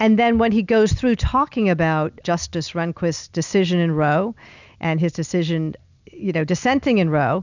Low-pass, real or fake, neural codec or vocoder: 7.2 kHz; real; none